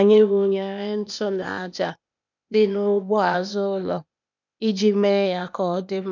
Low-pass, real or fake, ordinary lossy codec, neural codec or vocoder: 7.2 kHz; fake; none; codec, 16 kHz, 0.8 kbps, ZipCodec